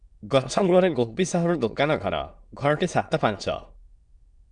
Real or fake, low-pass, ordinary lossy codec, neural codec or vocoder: fake; 9.9 kHz; Opus, 64 kbps; autoencoder, 22.05 kHz, a latent of 192 numbers a frame, VITS, trained on many speakers